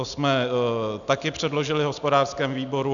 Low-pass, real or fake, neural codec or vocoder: 7.2 kHz; real; none